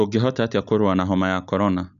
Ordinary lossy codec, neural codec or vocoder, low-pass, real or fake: none; none; 7.2 kHz; real